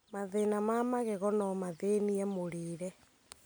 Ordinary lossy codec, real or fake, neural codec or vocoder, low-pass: none; real; none; none